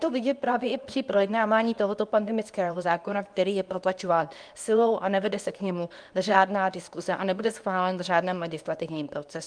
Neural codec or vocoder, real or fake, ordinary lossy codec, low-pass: codec, 24 kHz, 0.9 kbps, WavTokenizer, medium speech release version 2; fake; Opus, 32 kbps; 9.9 kHz